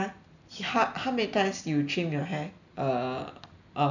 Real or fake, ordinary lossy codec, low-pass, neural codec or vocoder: real; none; 7.2 kHz; none